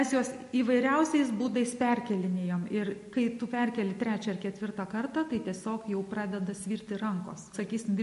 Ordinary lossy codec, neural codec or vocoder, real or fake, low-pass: MP3, 48 kbps; none; real; 14.4 kHz